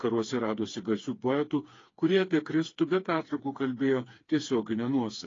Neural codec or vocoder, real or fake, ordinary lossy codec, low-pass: codec, 16 kHz, 4 kbps, FreqCodec, smaller model; fake; AAC, 32 kbps; 7.2 kHz